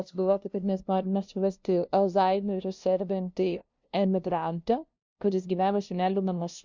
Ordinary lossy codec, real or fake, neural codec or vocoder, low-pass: MP3, 64 kbps; fake; codec, 16 kHz, 0.5 kbps, FunCodec, trained on LibriTTS, 25 frames a second; 7.2 kHz